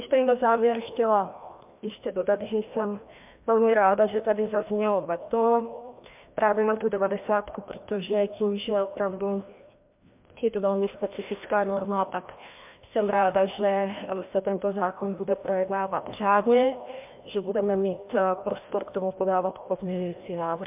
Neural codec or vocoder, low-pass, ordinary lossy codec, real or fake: codec, 16 kHz, 1 kbps, FreqCodec, larger model; 3.6 kHz; MP3, 32 kbps; fake